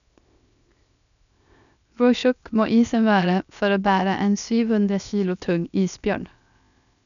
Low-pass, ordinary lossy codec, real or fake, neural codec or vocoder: 7.2 kHz; none; fake; codec, 16 kHz, 0.7 kbps, FocalCodec